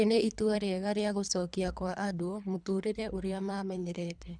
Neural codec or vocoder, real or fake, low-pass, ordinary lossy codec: codec, 24 kHz, 3 kbps, HILCodec; fake; 9.9 kHz; none